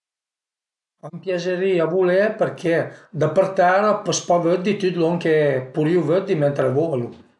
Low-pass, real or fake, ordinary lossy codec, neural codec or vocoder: 10.8 kHz; real; none; none